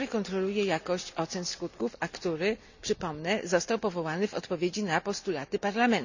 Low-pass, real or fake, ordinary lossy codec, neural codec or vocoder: 7.2 kHz; real; none; none